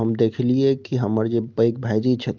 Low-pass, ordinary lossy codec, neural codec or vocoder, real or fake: 7.2 kHz; Opus, 24 kbps; none; real